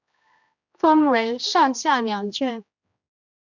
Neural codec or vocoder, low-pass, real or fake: codec, 16 kHz, 1 kbps, X-Codec, HuBERT features, trained on general audio; 7.2 kHz; fake